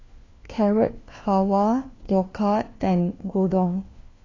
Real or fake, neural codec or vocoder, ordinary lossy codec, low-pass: fake; codec, 16 kHz, 1 kbps, FunCodec, trained on LibriTTS, 50 frames a second; AAC, 32 kbps; 7.2 kHz